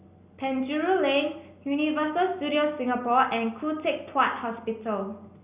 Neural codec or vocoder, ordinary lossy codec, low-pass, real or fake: none; Opus, 64 kbps; 3.6 kHz; real